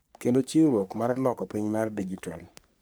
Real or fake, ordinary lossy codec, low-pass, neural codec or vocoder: fake; none; none; codec, 44.1 kHz, 3.4 kbps, Pupu-Codec